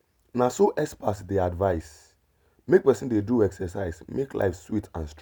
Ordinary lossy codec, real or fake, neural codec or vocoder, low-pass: none; real; none; none